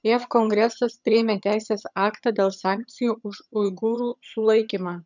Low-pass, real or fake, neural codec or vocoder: 7.2 kHz; fake; vocoder, 22.05 kHz, 80 mel bands, HiFi-GAN